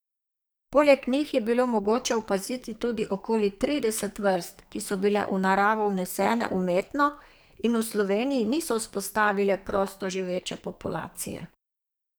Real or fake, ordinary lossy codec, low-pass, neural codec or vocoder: fake; none; none; codec, 44.1 kHz, 2.6 kbps, SNAC